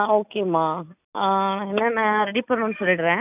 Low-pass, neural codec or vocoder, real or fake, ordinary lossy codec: 3.6 kHz; none; real; none